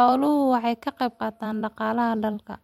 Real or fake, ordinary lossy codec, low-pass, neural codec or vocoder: fake; MP3, 64 kbps; 19.8 kHz; vocoder, 44.1 kHz, 128 mel bands every 256 samples, BigVGAN v2